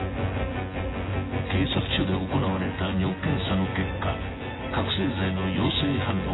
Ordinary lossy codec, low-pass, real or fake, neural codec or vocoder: AAC, 16 kbps; 7.2 kHz; fake; vocoder, 24 kHz, 100 mel bands, Vocos